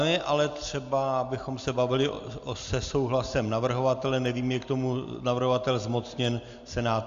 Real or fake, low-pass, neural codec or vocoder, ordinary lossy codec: real; 7.2 kHz; none; AAC, 64 kbps